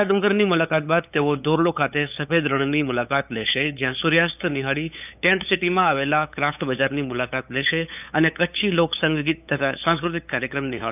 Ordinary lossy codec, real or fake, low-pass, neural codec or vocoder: none; fake; 3.6 kHz; codec, 16 kHz, 8 kbps, FunCodec, trained on LibriTTS, 25 frames a second